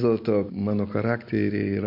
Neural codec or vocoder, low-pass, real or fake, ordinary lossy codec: none; 5.4 kHz; real; MP3, 32 kbps